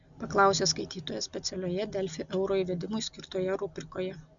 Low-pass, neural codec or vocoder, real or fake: 7.2 kHz; none; real